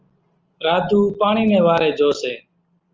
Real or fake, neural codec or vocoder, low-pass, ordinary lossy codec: real; none; 7.2 kHz; Opus, 24 kbps